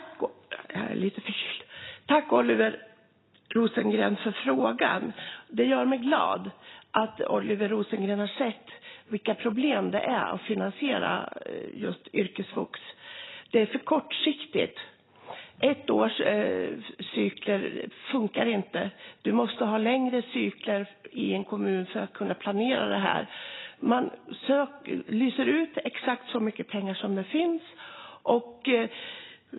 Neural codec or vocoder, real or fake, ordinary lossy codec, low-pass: none; real; AAC, 16 kbps; 7.2 kHz